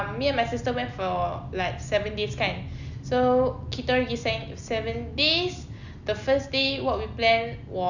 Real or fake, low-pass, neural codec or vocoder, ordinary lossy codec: real; 7.2 kHz; none; none